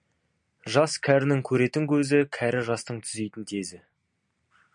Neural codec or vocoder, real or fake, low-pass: none; real; 9.9 kHz